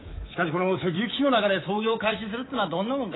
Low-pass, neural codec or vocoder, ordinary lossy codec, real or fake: 7.2 kHz; codec, 24 kHz, 3.1 kbps, DualCodec; AAC, 16 kbps; fake